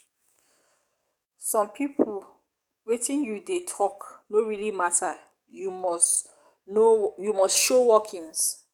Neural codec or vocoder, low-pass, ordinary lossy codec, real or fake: codec, 44.1 kHz, 7.8 kbps, DAC; 19.8 kHz; none; fake